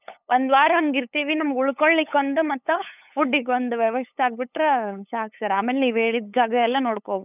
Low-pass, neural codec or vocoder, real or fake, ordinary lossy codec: 3.6 kHz; codec, 16 kHz, 8 kbps, FunCodec, trained on LibriTTS, 25 frames a second; fake; none